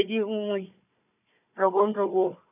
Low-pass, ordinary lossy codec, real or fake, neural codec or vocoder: 3.6 kHz; none; fake; codec, 16 kHz, 4 kbps, FunCodec, trained on Chinese and English, 50 frames a second